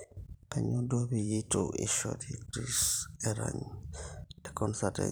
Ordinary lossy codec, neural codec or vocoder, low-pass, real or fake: none; vocoder, 44.1 kHz, 128 mel bands every 512 samples, BigVGAN v2; none; fake